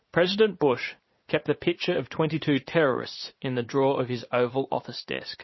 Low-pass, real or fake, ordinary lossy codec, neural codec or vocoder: 7.2 kHz; fake; MP3, 24 kbps; vocoder, 44.1 kHz, 80 mel bands, Vocos